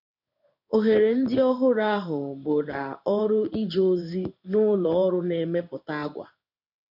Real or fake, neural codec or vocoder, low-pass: fake; codec, 16 kHz in and 24 kHz out, 1 kbps, XY-Tokenizer; 5.4 kHz